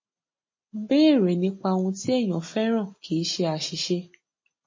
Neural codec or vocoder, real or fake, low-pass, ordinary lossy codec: none; real; 7.2 kHz; MP3, 32 kbps